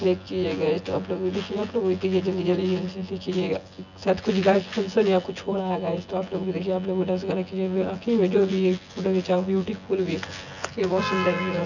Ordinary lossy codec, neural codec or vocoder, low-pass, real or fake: none; vocoder, 24 kHz, 100 mel bands, Vocos; 7.2 kHz; fake